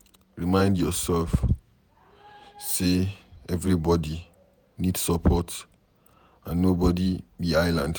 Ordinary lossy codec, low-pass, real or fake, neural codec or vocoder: none; none; fake; vocoder, 48 kHz, 128 mel bands, Vocos